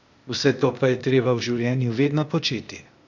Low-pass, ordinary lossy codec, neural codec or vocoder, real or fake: 7.2 kHz; none; codec, 16 kHz, 0.8 kbps, ZipCodec; fake